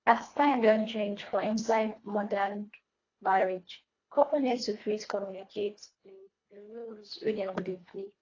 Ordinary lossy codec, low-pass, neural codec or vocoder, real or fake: AAC, 32 kbps; 7.2 kHz; codec, 24 kHz, 1.5 kbps, HILCodec; fake